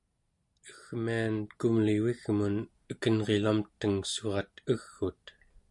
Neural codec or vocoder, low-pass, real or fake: none; 10.8 kHz; real